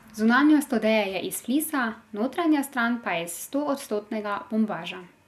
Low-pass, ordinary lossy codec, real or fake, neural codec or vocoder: 14.4 kHz; none; real; none